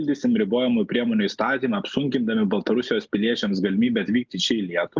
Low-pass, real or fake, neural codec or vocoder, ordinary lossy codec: 7.2 kHz; real; none; Opus, 24 kbps